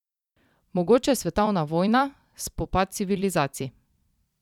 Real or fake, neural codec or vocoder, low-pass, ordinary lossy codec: fake; vocoder, 44.1 kHz, 128 mel bands every 256 samples, BigVGAN v2; 19.8 kHz; none